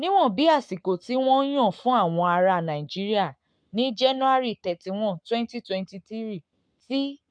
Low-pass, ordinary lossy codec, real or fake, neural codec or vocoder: 9.9 kHz; MP3, 64 kbps; fake; codec, 44.1 kHz, 7.8 kbps, Pupu-Codec